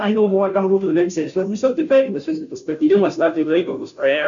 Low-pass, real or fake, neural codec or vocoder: 7.2 kHz; fake; codec, 16 kHz, 0.5 kbps, FunCodec, trained on Chinese and English, 25 frames a second